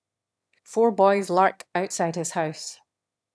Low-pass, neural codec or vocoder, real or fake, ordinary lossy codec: none; autoencoder, 22.05 kHz, a latent of 192 numbers a frame, VITS, trained on one speaker; fake; none